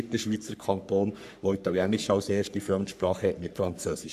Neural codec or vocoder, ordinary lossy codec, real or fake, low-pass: codec, 44.1 kHz, 3.4 kbps, Pupu-Codec; MP3, 96 kbps; fake; 14.4 kHz